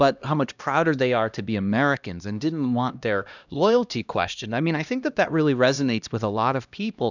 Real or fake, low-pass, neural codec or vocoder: fake; 7.2 kHz; codec, 16 kHz, 1 kbps, X-Codec, HuBERT features, trained on LibriSpeech